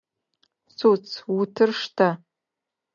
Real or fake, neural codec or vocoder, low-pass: real; none; 7.2 kHz